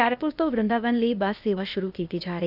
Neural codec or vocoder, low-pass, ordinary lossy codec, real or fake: codec, 16 kHz, 0.8 kbps, ZipCodec; 5.4 kHz; none; fake